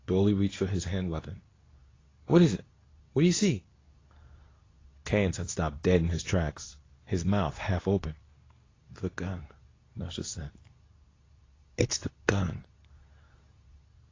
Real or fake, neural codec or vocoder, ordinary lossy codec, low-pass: fake; codec, 24 kHz, 0.9 kbps, WavTokenizer, medium speech release version 2; AAC, 32 kbps; 7.2 kHz